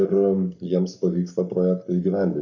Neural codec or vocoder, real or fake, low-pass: codec, 44.1 kHz, 7.8 kbps, Pupu-Codec; fake; 7.2 kHz